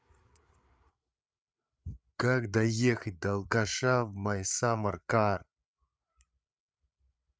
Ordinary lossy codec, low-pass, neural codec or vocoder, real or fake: none; none; codec, 16 kHz, 8 kbps, FreqCodec, larger model; fake